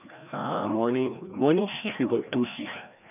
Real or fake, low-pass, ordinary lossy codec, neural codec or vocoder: fake; 3.6 kHz; none; codec, 16 kHz, 1 kbps, FreqCodec, larger model